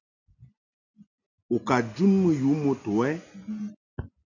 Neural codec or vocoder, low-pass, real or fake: none; 7.2 kHz; real